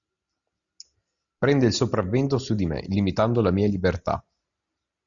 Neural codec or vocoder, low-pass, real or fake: none; 7.2 kHz; real